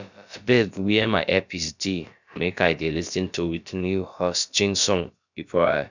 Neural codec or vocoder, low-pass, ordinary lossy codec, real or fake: codec, 16 kHz, about 1 kbps, DyCAST, with the encoder's durations; 7.2 kHz; none; fake